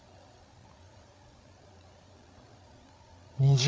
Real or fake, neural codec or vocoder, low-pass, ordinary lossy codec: fake; codec, 16 kHz, 16 kbps, FreqCodec, larger model; none; none